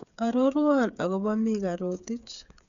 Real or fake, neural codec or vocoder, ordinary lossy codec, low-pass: fake; codec, 16 kHz, 8 kbps, FreqCodec, smaller model; none; 7.2 kHz